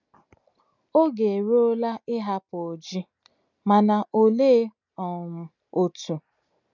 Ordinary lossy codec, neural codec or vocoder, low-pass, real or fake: none; none; 7.2 kHz; real